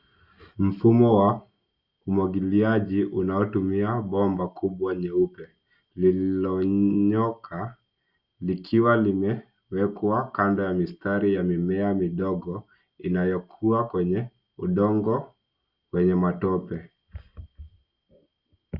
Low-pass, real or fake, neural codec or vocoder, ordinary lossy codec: 5.4 kHz; real; none; Opus, 64 kbps